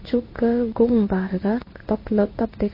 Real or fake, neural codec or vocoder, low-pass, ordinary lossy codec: fake; codec, 16 kHz in and 24 kHz out, 1 kbps, XY-Tokenizer; 5.4 kHz; MP3, 24 kbps